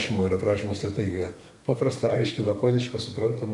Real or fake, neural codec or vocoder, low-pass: fake; codec, 32 kHz, 1.9 kbps, SNAC; 10.8 kHz